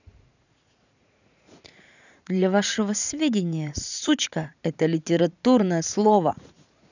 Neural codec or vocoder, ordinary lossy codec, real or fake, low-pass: vocoder, 44.1 kHz, 80 mel bands, Vocos; none; fake; 7.2 kHz